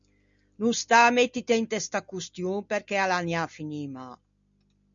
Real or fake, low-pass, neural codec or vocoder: real; 7.2 kHz; none